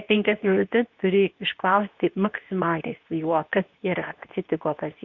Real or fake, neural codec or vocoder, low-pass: fake; codec, 24 kHz, 0.9 kbps, WavTokenizer, medium speech release version 2; 7.2 kHz